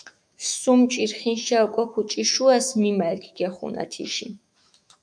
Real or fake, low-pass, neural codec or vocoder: fake; 9.9 kHz; autoencoder, 48 kHz, 128 numbers a frame, DAC-VAE, trained on Japanese speech